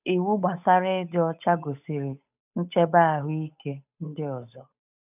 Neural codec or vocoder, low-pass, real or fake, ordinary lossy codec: codec, 16 kHz, 8 kbps, FunCodec, trained on Chinese and English, 25 frames a second; 3.6 kHz; fake; none